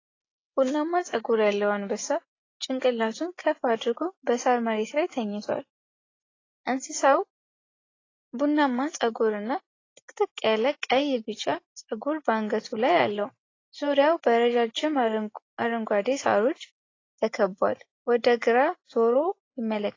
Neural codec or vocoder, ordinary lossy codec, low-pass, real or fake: none; AAC, 32 kbps; 7.2 kHz; real